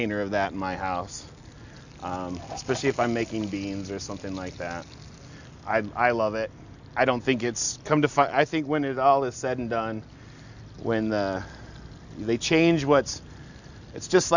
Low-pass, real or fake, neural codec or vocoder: 7.2 kHz; real; none